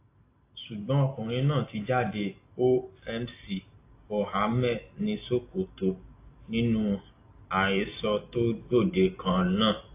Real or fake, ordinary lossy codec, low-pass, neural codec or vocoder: real; AAC, 24 kbps; 3.6 kHz; none